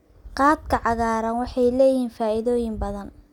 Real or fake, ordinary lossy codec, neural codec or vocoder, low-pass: real; none; none; 19.8 kHz